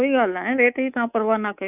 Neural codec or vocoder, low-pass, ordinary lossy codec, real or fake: codec, 24 kHz, 3.1 kbps, DualCodec; 3.6 kHz; none; fake